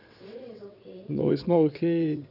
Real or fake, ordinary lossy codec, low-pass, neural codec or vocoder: fake; none; 5.4 kHz; vocoder, 22.05 kHz, 80 mel bands, WaveNeXt